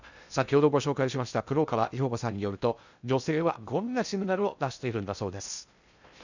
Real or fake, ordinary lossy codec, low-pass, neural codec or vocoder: fake; none; 7.2 kHz; codec, 16 kHz in and 24 kHz out, 0.6 kbps, FocalCodec, streaming, 2048 codes